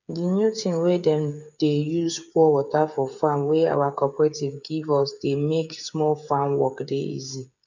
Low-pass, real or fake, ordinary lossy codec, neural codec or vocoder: 7.2 kHz; fake; none; codec, 16 kHz, 8 kbps, FreqCodec, smaller model